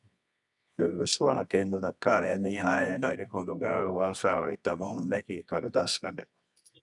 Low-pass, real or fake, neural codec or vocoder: 10.8 kHz; fake; codec, 24 kHz, 0.9 kbps, WavTokenizer, medium music audio release